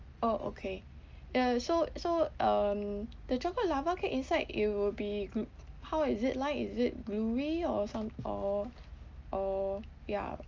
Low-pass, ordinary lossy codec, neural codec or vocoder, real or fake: 7.2 kHz; Opus, 24 kbps; none; real